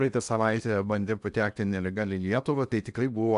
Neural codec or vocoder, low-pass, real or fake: codec, 16 kHz in and 24 kHz out, 0.8 kbps, FocalCodec, streaming, 65536 codes; 10.8 kHz; fake